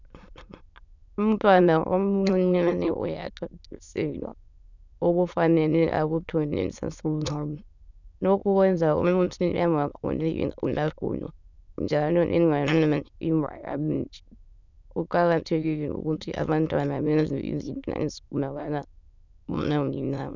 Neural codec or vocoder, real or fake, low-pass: autoencoder, 22.05 kHz, a latent of 192 numbers a frame, VITS, trained on many speakers; fake; 7.2 kHz